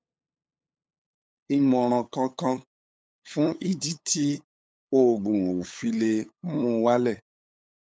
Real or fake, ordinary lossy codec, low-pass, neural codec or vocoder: fake; none; none; codec, 16 kHz, 8 kbps, FunCodec, trained on LibriTTS, 25 frames a second